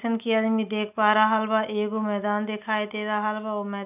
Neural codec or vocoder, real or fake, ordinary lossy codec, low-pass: none; real; none; 3.6 kHz